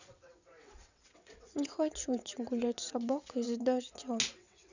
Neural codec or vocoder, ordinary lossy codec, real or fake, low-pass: vocoder, 22.05 kHz, 80 mel bands, WaveNeXt; none; fake; 7.2 kHz